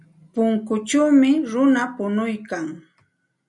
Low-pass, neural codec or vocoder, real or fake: 10.8 kHz; none; real